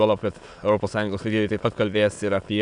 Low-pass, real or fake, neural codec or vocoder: 9.9 kHz; fake; autoencoder, 22.05 kHz, a latent of 192 numbers a frame, VITS, trained on many speakers